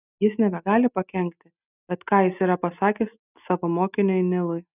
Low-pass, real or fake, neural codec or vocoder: 3.6 kHz; real; none